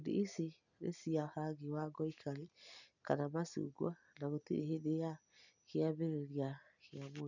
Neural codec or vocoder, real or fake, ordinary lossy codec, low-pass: none; real; none; 7.2 kHz